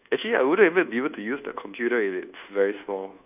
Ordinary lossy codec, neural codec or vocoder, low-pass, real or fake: none; codec, 24 kHz, 1.2 kbps, DualCodec; 3.6 kHz; fake